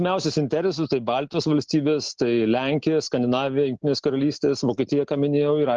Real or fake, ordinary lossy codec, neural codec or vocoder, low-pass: real; Opus, 16 kbps; none; 7.2 kHz